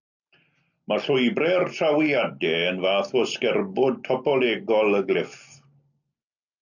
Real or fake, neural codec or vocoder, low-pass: real; none; 7.2 kHz